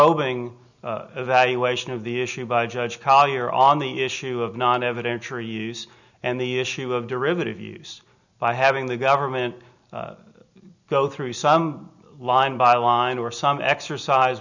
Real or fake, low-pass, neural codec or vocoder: real; 7.2 kHz; none